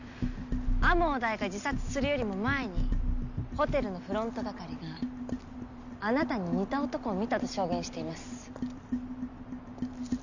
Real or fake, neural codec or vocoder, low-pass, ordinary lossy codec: real; none; 7.2 kHz; none